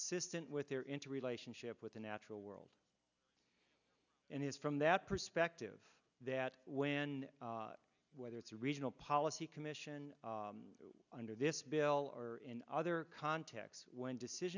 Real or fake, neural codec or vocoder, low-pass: real; none; 7.2 kHz